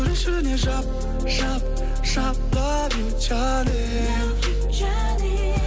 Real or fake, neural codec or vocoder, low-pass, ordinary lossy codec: real; none; none; none